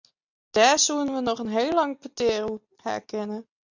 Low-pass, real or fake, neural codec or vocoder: 7.2 kHz; real; none